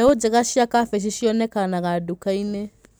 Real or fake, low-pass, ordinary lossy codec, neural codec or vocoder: real; none; none; none